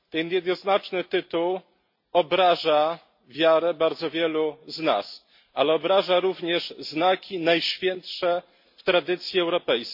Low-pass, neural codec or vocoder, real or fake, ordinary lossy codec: 5.4 kHz; none; real; MP3, 32 kbps